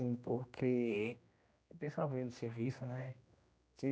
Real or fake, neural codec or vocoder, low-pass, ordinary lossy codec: fake; codec, 16 kHz, 1 kbps, X-Codec, HuBERT features, trained on general audio; none; none